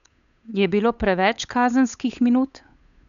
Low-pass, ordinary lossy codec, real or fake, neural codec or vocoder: 7.2 kHz; none; fake; codec, 16 kHz, 8 kbps, FunCodec, trained on Chinese and English, 25 frames a second